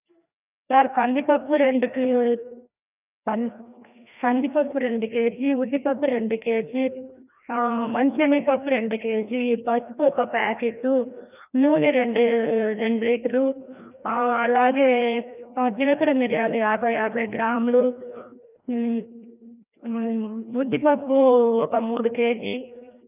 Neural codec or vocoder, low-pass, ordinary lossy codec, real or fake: codec, 16 kHz, 1 kbps, FreqCodec, larger model; 3.6 kHz; none; fake